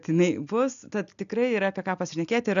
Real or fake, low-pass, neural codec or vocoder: real; 7.2 kHz; none